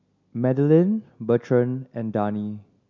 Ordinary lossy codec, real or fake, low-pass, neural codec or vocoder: none; real; 7.2 kHz; none